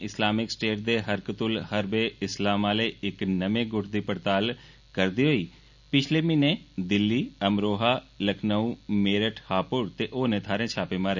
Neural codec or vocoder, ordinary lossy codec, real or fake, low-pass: none; none; real; 7.2 kHz